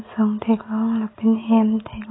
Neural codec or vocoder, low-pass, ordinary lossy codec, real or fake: none; 7.2 kHz; AAC, 16 kbps; real